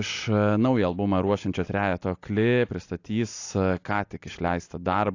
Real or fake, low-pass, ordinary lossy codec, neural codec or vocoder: real; 7.2 kHz; AAC, 48 kbps; none